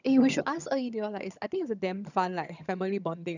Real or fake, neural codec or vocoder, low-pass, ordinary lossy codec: fake; vocoder, 22.05 kHz, 80 mel bands, HiFi-GAN; 7.2 kHz; none